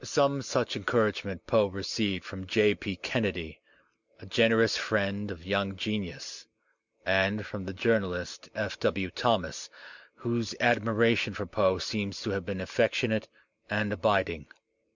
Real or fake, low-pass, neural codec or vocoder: real; 7.2 kHz; none